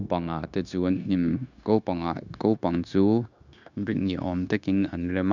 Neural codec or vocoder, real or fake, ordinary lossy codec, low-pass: codec, 16 kHz, 0.9 kbps, LongCat-Audio-Codec; fake; none; 7.2 kHz